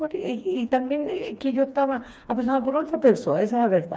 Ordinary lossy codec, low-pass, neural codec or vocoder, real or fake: none; none; codec, 16 kHz, 2 kbps, FreqCodec, smaller model; fake